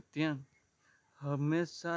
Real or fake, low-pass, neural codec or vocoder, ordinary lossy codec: real; none; none; none